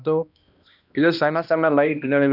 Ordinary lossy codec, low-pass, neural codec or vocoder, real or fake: none; 5.4 kHz; codec, 16 kHz, 1 kbps, X-Codec, HuBERT features, trained on balanced general audio; fake